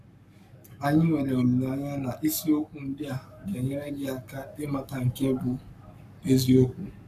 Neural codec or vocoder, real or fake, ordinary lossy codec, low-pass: codec, 44.1 kHz, 7.8 kbps, Pupu-Codec; fake; AAC, 96 kbps; 14.4 kHz